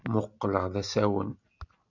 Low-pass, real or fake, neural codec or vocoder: 7.2 kHz; real; none